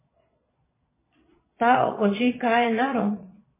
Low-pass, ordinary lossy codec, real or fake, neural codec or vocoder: 3.6 kHz; MP3, 16 kbps; fake; vocoder, 22.05 kHz, 80 mel bands, Vocos